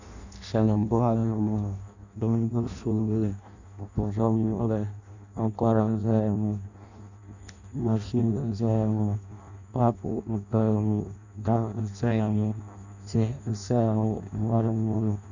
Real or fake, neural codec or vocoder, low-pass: fake; codec, 16 kHz in and 24 kHz out, 0.6 kbps, FireRedTTS-2 codec; 7.2 kHz